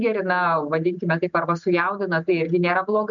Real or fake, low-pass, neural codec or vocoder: real; 7.2 kHz; none